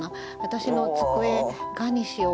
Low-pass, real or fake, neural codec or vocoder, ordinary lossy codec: none; real; none; none